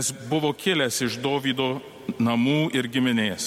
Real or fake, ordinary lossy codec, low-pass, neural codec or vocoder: real; MP3, 64 kbps; 19.8 kHz; none